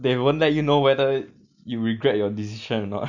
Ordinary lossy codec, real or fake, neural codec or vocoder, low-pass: none; real; none; 7.2 kHz